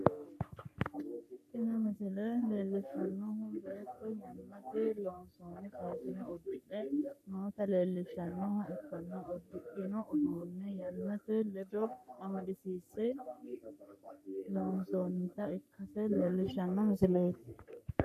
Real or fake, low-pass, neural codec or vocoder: fake; 14.4 kHz; codec, 44.1 kHz, 3.4 kbps, Pupu-Codec